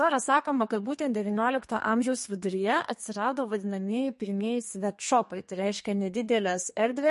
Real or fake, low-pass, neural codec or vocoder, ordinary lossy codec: fake; 14.4 kHz; codec, 32 kHz, 1.9 kbps, SNAC; MP3, 48 kbps